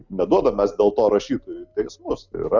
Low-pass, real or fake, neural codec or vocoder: 7.2 kHz; real; none